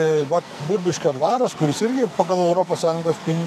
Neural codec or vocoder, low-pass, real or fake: codec, 44.1 kHz, 2.6 kbps, SNAC; 14.4 kHz; fake